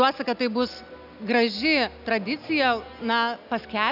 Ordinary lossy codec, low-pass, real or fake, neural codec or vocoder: AAC, 48 kbps; 5.4 kHz; real; none